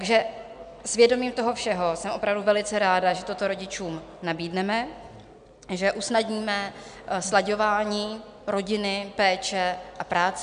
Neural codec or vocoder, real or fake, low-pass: none; real; 9.9 kHz